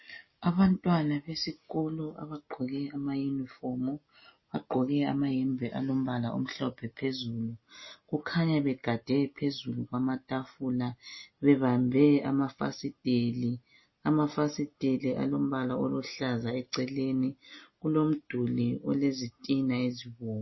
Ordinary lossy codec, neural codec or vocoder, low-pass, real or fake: MP3, 24 kbps; none; 7.2 kHz; real